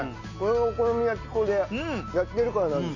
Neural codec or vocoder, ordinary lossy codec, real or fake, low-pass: none; none; real; 7.2 kHz